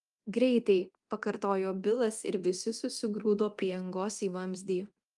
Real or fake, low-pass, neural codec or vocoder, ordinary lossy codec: fake; 10.8 kHz; codec, 24 kHz, 0.9 kbps, DualCodec; Opus, 32 kbps